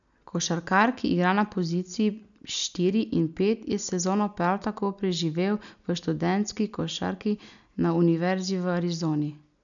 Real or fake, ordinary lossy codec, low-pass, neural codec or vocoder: real; none; 7.2 kHz; none